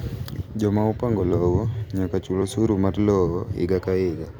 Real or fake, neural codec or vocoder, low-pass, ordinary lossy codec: fake; vocoder, 44.1 kHz, 128 mel bands every 256 samples, BigVGAN v2; none; none